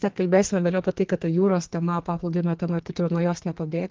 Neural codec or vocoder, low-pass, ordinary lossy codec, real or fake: codec, 44.1 kHz, 2.6 kbps, SNAC; 7.2 kHz; Opus, 16 kbps; fake